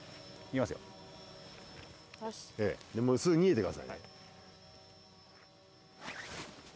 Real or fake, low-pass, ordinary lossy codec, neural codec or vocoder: real; none; none; none